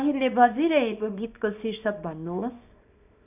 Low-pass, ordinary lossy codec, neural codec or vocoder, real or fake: 3.6 kHz; none; codec, 24 kHz, 0.9 kbps, WavTokenizer, small release; fake